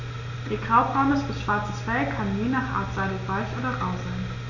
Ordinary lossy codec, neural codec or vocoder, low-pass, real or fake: none; none; 7.2 kHz; real